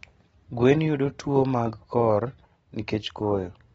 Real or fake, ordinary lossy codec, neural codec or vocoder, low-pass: real; AAC, 24 kbps; none; 9.9 kHz